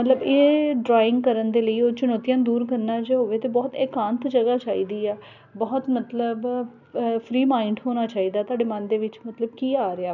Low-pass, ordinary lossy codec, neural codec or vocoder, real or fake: 7.2 kHz; none; none; real